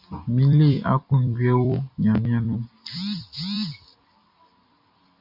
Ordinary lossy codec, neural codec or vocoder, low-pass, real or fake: MP3, 48 kbps; vocoder, 44.1 kHz, 128 mel bands every 256 samples, BigVGAN v2; 5.4 kHz; fake